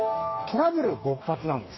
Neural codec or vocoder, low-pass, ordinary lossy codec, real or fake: codec, 44.1 kHz, 2.6 kbps, DAC; 7.2 kHz; MP3, 24 kbps; fake